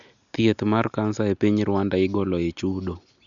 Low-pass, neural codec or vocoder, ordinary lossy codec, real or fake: 7.2 kHz; none; none; real